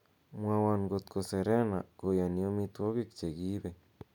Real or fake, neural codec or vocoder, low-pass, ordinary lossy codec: real; none; 19.8 kHz; none